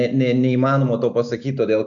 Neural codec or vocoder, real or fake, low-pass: none; real; 7.2 kHz